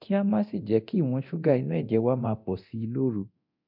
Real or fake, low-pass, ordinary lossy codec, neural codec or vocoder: fake; 5.4 kHz; MP3, 48 kbps; codec, 24 kHz, 0.9 kbps, DualCodec